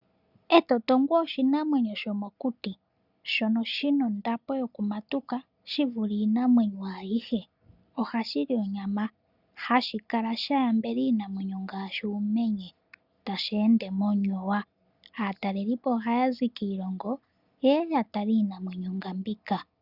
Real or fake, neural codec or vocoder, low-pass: real; none; 5.4 kHz